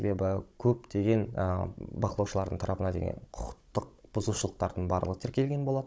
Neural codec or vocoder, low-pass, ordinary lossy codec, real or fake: codec, 16 kHz, 16 kbps, FunCodec, trained on Chinese and English, 50 frames a second; none; none; fake